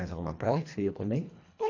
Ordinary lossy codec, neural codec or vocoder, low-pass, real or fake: AAC, 48 kbps; codec, 24 kHz, 1.5 kbps, HILCodec; 7.2 kHz; fake